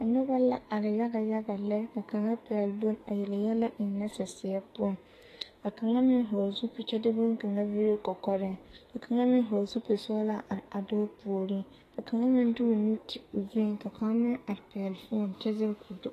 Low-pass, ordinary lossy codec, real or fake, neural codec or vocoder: 14.4 kHz; AAC, 48 kbps; fake; codec, 44.1 kHz, 2.6 kbps, SNAC